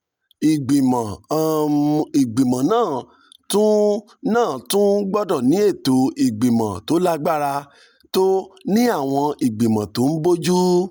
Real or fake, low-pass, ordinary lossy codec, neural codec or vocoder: real; none; none; none